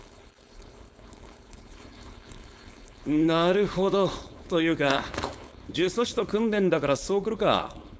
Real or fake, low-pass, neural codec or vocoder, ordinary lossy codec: fake; none; codec, 16 kHz, 4.8 kbps, FACodec; none